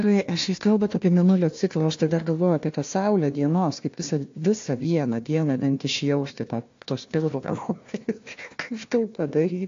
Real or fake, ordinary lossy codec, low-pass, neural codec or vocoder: fake; AAC, 48 kbps; 7.2 kHz; codec, 16 kHz, 1 kbps, FunCodec, trained on Chinese and English, 50 frames a second